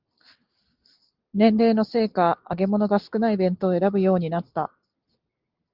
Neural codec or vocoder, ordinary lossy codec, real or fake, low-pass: none; Opus, 32 kbps; real; 5.4 kHz